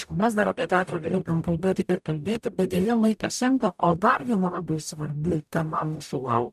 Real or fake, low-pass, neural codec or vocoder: fake; 14.4 kHz; codec, 44.1 kHz, 0.9 kbps, DAC